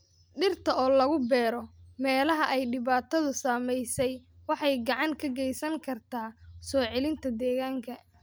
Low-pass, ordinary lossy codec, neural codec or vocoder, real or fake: none; none; none; real